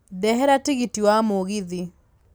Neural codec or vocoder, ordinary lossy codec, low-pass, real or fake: none; none; none; real